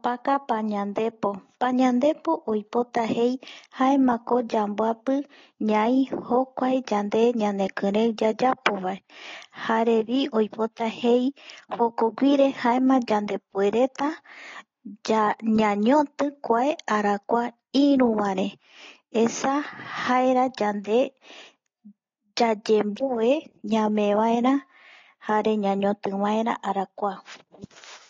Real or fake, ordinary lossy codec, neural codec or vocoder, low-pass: real; AAC, 32 kbps; none; 7.2 kHz